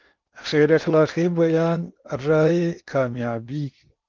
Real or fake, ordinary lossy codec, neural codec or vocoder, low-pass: fake; Opus, 24 kbps; codec, 16 kHz in and 24 kHz out, 0.8 kbps, FocalCodec, streaming, 65536 codes; 7.2 kHz